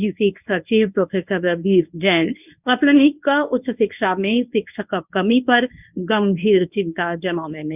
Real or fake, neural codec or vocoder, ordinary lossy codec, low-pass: fake; codec, 24 kHz, 0.9 kbps, WavTokenizer, medium speech release version 1; none; 3.6 kHz